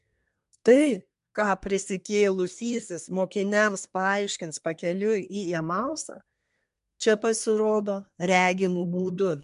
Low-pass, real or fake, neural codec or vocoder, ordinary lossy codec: 10.8 kHz; fake; codec, 24 kHz, 1 kbps, SNAC; MP3, 64 kbps